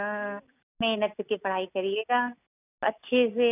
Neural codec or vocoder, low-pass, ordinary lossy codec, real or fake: none; 3.6 kHz; none; real